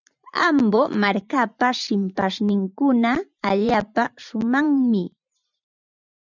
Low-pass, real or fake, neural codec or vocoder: 7.2 kHz; fake; vocoder, 44.1 kHz, 128 mel bands every 512 samples, BigVGAN v2